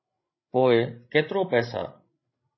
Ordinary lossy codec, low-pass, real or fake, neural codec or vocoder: MP3, 24 kbps; 7.2 kHz; fake; codec, 16 kHz, 16 kbps, FreqCodec, larger model